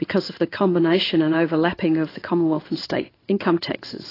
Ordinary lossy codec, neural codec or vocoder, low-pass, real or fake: AAC, 24 kbps; codec, 16 kHz in and 24 kHz out, 1 kbps, XY-Tokenizer; 5.4 kHz; fake